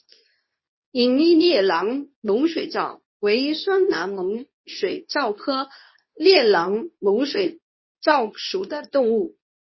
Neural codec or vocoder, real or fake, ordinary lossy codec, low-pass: codec, 24 kHz, 0.9 kbps, WavTokenizer, medium speech release version 2; fake; MP3, 24 kbps; 7.2 kHz